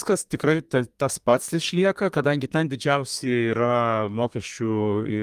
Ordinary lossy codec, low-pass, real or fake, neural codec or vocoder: Opus, 32 kbps; 14.4 kHz; fake; codec, 32 kHz, 1.9 kbps, SNAC